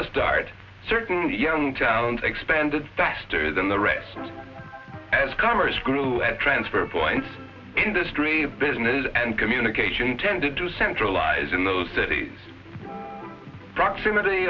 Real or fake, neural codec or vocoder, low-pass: real; none; 7.2 kHz